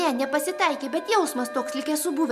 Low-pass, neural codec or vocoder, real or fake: 14.4 kHz; none; real